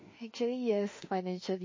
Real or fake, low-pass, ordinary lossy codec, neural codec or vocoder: fake; 7.2 kHz; MP3, 32 kbps; autoencoder, 48 kHz, 32 numbers a frame, DAC-VAE, trained on Japanese speech